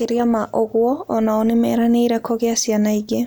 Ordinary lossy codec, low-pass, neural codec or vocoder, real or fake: none; none; none; real